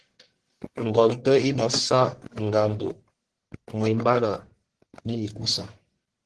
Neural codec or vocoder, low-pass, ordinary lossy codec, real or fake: codec, 44.1 kHz, 1.7 kbps, Pupu-Codec; 10.8 kHz; Opus, 16 kbps; fake